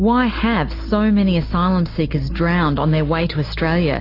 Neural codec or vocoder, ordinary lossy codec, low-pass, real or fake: none; AAC, 32 kbps; 5.4 kHz; real